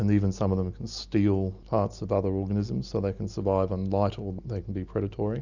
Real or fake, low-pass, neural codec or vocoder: real; 7.2 kHz; none